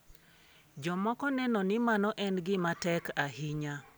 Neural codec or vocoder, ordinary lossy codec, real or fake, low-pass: none; none; real; none